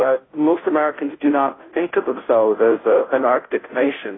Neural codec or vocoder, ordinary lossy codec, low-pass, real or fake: codec, 16 kHz, 0.5 kbps, FunCodec, trained on Chinese and English, 25 frames a second; AAC, 16 kbps; 7.2 kHz; fake